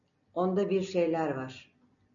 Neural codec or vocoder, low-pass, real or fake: none; 7.2 kHz; real